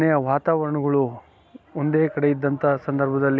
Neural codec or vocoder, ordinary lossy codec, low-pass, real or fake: none; none; none; real